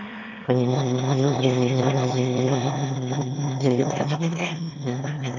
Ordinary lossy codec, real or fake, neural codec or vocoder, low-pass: none; fake; autoencoder, 22.05 kHz, a latent of 192 numbers a frame, VITS, trained on one speaker; 7.2 kHz